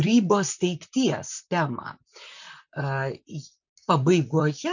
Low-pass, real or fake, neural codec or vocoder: 7.2 kHz; fake; vocoder, 44.1 kHz, 128 mel bands, Pupu-Vocoder